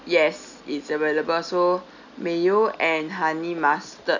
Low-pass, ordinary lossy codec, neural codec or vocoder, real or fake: 7.2 kHz; none; none; real